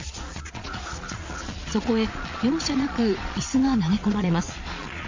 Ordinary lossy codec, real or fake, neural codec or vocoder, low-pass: MP3, 48 kbps; fake; vocoder, 22.05 kHz, 80 mel bands, Vocos; 7.2 kHz